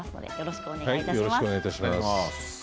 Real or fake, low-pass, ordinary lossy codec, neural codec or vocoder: real; none; none; none